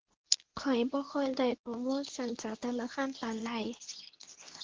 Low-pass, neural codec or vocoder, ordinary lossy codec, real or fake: 7.2 kHz; codec, 24 kHz, 0.9 kbps, WavTokenizer, medium speech release version 2; Opus, 16 kbps; fake